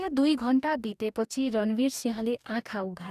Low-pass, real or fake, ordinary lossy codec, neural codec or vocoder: 14.4 kHz; fake; none; codec, 44.1 kHz, 2.6 kbps, DAC